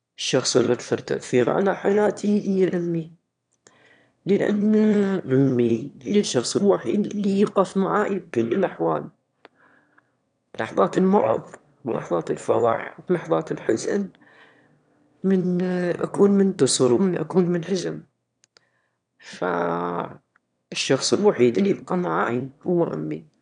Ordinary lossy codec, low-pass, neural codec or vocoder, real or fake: none; 9.9 kHz; autoencoder, 22.05 kHz, a latent of 192 numbers a frame, VITS, trained on one speaker; fake